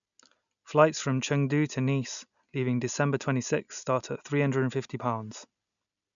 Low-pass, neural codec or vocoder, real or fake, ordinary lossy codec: 7.2 kHz; none; real; none